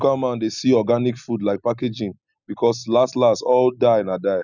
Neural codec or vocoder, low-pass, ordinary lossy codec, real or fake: none; 7.2 kHz; none; real